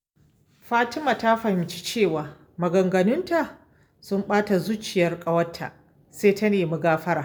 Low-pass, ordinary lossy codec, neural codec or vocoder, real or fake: none; none; none; real